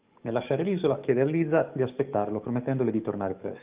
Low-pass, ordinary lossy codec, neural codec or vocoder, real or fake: 3.6 kHz; Opus, 32 kbps; codec, 44.1 kHz, 7.8 kbps, DAC; fake